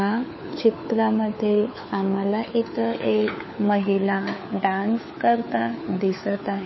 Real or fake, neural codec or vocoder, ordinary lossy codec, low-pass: fake; codec, 16 kHz, 4 kbps, FunCodec, trained on LibriTTS, 50 frames a second; MP3, 24 kbps; 7.2 kHz